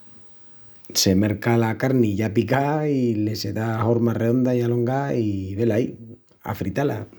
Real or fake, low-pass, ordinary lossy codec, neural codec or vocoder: real; none; none; none